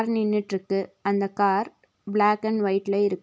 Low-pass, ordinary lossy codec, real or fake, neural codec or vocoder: none; none; real; none